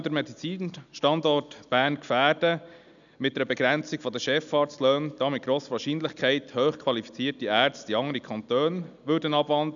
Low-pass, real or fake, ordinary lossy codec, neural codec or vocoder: 7.2 kHz; real; none; none